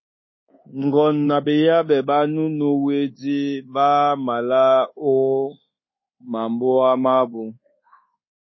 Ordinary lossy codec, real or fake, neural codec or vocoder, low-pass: MP3, 24 kbps; fake; codec, 24 kHz, 1.2 kbps, DualCodec; 7.2 kHz